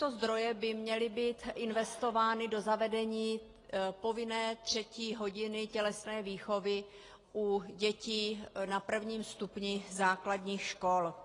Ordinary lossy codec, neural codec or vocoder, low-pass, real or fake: AAC, 32 kbps; none; 10.8 kHz; real